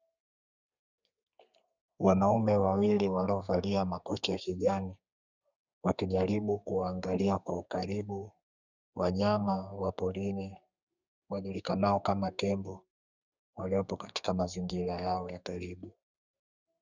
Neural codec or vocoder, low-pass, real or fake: codec, 32 kHz, 1.9 kbps, SNAC; 7.2 kHz; fake